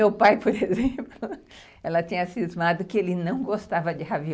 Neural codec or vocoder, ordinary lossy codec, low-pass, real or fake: none; none; none; real